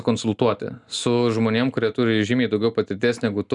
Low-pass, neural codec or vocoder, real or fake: 10.8 kHz; none; real